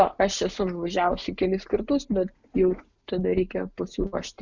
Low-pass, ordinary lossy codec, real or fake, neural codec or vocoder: 7.2 kHz; Opus, 64 kbps; real; none